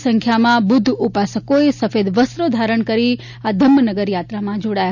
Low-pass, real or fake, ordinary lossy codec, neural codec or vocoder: 7.2 kHz; real; none; none